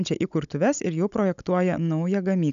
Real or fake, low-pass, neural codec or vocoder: fake; 7.2 kHz; codec, 16 kHz, 16 kbps, FunCodec, trained on Chinese and English, 50 frames a second